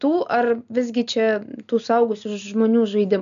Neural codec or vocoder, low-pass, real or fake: none; 7.2 kHz; real